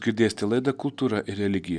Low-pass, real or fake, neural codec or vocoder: 9.9 kHz; real; none